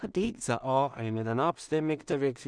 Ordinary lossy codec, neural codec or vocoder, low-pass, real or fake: none; codec, 16 kHz in and 24 kHz out, 0.4 kbps, LongCat-Audio-Codec, two codebook decoder; 9.9 kHz; fake